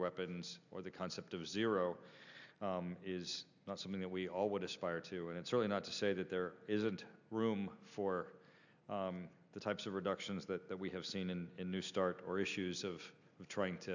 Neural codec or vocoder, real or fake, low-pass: none; real; 7.2 kHz